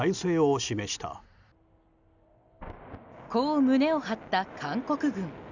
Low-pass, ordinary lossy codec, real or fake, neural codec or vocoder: 7.2 kHz; none; real; none